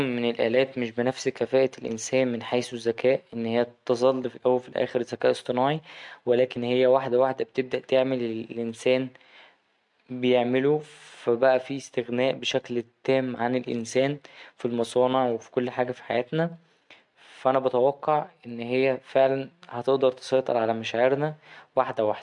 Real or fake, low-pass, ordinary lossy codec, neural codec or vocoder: real; 10.8 kHz; MP3, 64 kbps; none